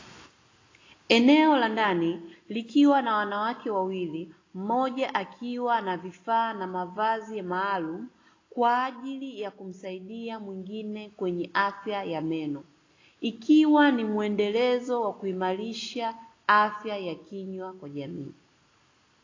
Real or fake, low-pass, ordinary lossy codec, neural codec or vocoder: real; 7.2 kHz; AAC, 32 kbps; none